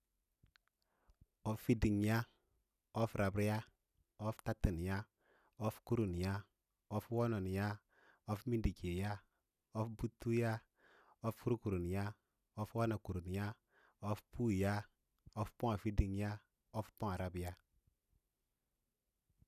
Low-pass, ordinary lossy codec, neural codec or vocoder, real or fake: 14.4 kHz; none; none; real